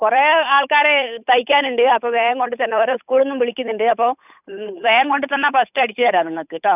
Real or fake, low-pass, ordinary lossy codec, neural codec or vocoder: fake; 3.6 kHz; none; codec, 16 kHz, 8 kbps, FunCodec, trained on Chinese and English, 25 frames a second